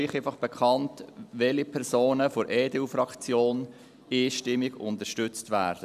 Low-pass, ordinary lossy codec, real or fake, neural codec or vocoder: 14.4 kHz; none; real; none